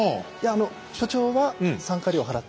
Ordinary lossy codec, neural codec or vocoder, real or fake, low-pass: none; none; real; none